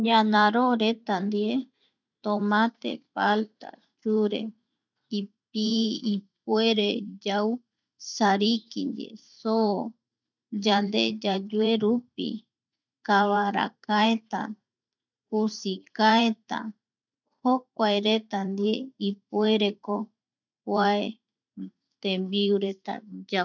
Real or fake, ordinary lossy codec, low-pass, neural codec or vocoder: fake; none; 7.2 kHz; vocoder, 44.1 kHz, 128 mel bands every 512 samples, BigVGAN v2